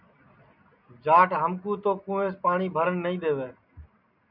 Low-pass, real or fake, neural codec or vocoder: 5.4 kHz; real; none